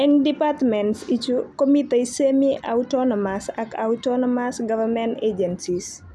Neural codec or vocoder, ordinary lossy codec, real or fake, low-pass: none; none; real; none